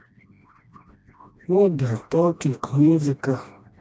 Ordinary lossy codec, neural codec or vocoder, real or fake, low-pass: none; codec, 16 kHz, 1 kbps, FreqCodec, smaller model; fake; none